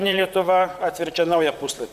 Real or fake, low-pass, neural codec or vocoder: fake; 14.4 kHz; vocoder, 44.1 kHz, 128 mel bands, Pupu-Vocoder